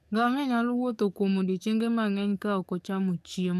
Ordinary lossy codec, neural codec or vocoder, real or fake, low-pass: none; codec, 44.1 kHz, 7.8 kbps, DAC; fake; 14.4 kHz